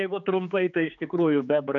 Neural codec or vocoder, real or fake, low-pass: codec, 16 kHz, 4 kbps, X-Codec, HuBERT features, trained on general audio; fake; 7.2 kHz